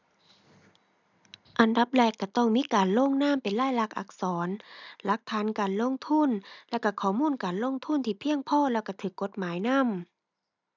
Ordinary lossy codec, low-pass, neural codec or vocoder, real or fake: none; 7.2 kHz; none; real